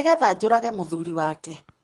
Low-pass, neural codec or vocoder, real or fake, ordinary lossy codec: 10.8 kHz; codec, 24 kHz, 3 kbps, HILCodec; fake; Opus, 32 kbps